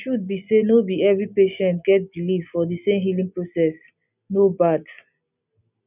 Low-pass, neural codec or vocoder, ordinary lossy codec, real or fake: 3.6 kHz; none; none; real